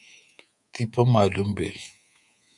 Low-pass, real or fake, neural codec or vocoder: 10.8 kHz; fake; codec, 24 kHz, 3.1 kbps, DualCodec